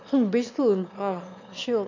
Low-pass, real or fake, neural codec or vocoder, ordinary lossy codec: 7.2 kHz; fake; autoencoder, 22.05 kHz, a latent of 192 numbers a frame, VITS, trained on one speaker; none